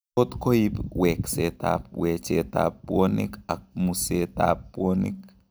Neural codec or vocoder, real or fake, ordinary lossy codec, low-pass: none; real; none; none